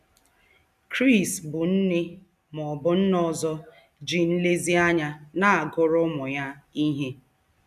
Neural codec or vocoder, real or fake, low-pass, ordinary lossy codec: none; real; 14.4 kHz; none